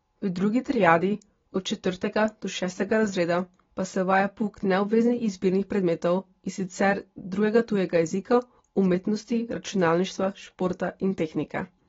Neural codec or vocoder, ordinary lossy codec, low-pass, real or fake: none; AAC, 24 kbps; 7.2 kHz; real